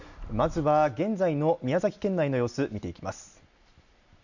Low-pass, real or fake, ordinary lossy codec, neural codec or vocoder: 7.2 kHz; real; none; none